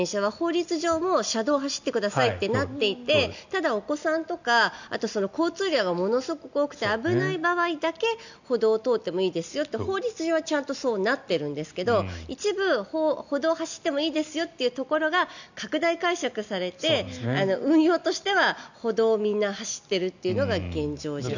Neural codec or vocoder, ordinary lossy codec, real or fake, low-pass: none; none; real; 7.2 kHz